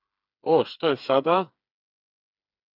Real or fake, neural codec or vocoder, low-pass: fake; codec, 16 kHz, 4 kbps, FreqCodec, smaller model; 5.4 kHz